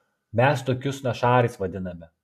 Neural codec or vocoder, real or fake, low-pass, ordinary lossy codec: none; real; 14.4 kHz; AAC, 96 kbps